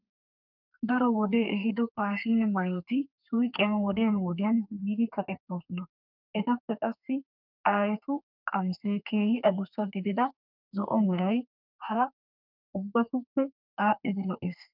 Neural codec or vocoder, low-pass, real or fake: codec, 32 kHz, 1.9 kbps, SNAC; 5.4 kHz; fake